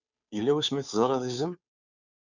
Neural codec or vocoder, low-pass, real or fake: codec, 16 kHz, 2 kbps, FunCodec, trained on Chinese and English, 25 frames a second; 7.2 kHz; fake